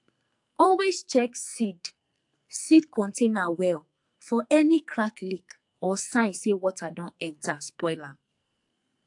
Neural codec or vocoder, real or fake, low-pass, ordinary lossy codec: codec, 44.1 kHz, 2.6 kbps, SNAC; fake; 10.8 kHz; AAC, 64 kbps